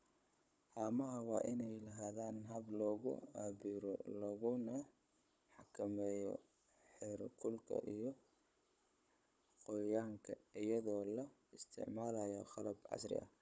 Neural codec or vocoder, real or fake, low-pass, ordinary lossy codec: codec, 16 kHz, 16 kbps, FunCodec, trained on Chinese and English, 50 frames a second; fake; none; none